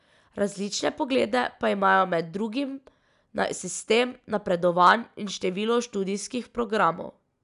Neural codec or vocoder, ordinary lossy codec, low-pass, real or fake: none; none; 10.8 kHz; real